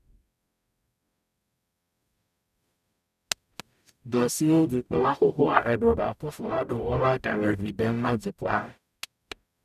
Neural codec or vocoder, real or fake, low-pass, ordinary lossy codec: codec, 44.1 kHz, 0.9 kbps, DAC; fake; 14.4 kHz; none